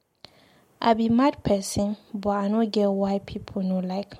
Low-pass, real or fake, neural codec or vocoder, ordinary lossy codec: 19.8 kHz; real; none; MP3, 64 kbps